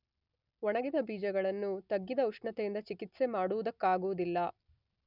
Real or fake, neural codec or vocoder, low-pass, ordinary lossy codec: real; none; 5.4 kHz; none